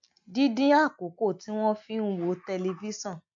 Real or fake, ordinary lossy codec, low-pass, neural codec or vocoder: real; AAC, 64 kbps; 7.2 kHz; none